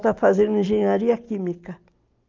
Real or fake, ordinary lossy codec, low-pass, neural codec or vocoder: real; Opus, 32 kbps; 7.2 kHz; none